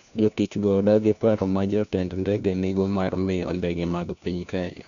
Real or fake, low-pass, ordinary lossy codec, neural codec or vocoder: fake; 7.2 kHz; none; codec, 16 kHz, 1 kbps, FunCodec, trained on LibriTTS, 50 frames a second